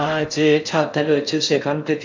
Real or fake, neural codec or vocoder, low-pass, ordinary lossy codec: fake; codec, 16 kHz in and 24 kHz out, 0.6 kbps, FocalCodec, streaming, 4096 codes; 7.2 kHz; MP3, 64 kbps